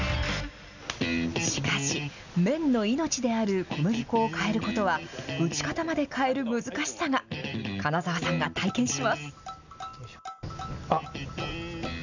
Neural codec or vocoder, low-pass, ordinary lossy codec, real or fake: none; 7.2 kHz; none; real